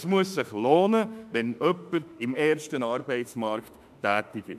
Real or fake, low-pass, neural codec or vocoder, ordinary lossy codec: fake; 14.4 kHz; autoencoder, 48 kHz, 32 numbers a frame, DAC-VAE, trained on Japanese speech; none